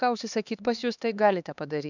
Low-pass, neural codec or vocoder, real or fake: 7.2 kHz; codec, 16 kHz, 2 kbps, X-Codec, HuBERT features, trained on LibriSpeech; fake